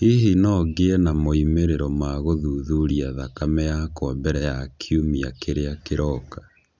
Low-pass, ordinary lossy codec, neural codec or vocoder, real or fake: none; none; none; real